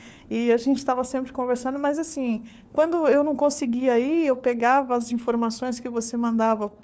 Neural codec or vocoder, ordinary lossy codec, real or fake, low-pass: codec, 16 kHz, 4 kbps, FunCodec, trained on LibriTTS, 50 frames a second; none; fake; none